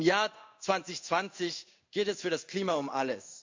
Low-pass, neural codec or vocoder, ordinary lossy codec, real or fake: 7.2 kHz; codec, 16 kHz in and 24 kHz out, 1 kbps, XY-Tokenizer; MP3, 64 kbps; fake